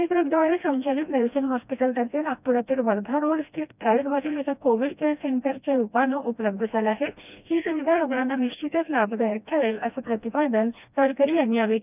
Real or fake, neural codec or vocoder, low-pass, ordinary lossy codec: fake; codec, 16 kHz, 1 kbps, FreqCodec, smaller model; 3.6 kHz; none